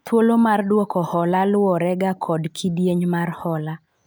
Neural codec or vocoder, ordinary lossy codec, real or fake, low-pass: none; none; real; none